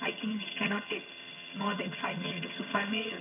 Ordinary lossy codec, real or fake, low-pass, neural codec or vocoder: none; fake; 3.6 kHz; vocoder, 22.05 kHz, 80 mel bands, HiFi-GAN